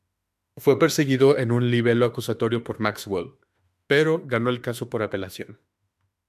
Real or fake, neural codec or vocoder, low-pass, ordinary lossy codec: fake; autoencoder, 48 kHz, 32 numbers a frame, DAC-VAE, trained on Japanese speech; 14.4 kHz; AAC, 96 kbps